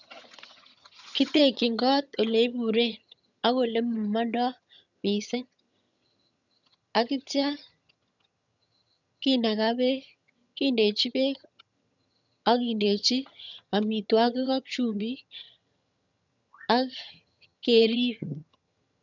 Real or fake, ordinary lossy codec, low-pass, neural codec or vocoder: fake; none; 7.2 kHz; vocoder, 22.05 kHz, 80 mel bands, HiFi-GAN